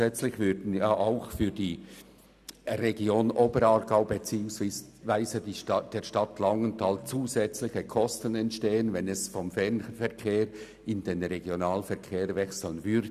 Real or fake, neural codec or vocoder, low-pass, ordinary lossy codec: real; none; 14.4 kHz; none